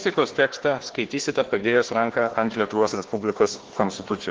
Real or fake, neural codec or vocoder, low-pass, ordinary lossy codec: fake; codec, 16 kHz, 1 kbps, FunCodec, trained on Chinese and English, 50 frames a second; 7.2 kHz; Opus, 16 kbps